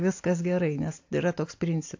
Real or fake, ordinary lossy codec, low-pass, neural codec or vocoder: real; AAC, 48 kbps; 7.2 kHz; none